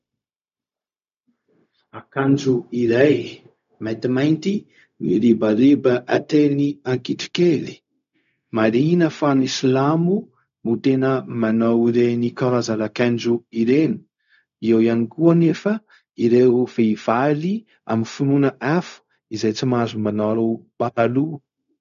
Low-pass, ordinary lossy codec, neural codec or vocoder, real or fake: 7.2 kHz; AAC, 96 kbps; codec, 16 kHz, 0.4 kbps, LongCat-Audio-Codec; fake